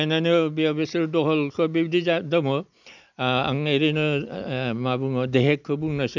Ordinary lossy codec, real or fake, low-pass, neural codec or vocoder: none; real; 7.2 kHz; none